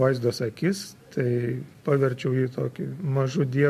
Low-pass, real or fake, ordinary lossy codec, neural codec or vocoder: 14.4 kHz; real; MP3, 64 kbps; none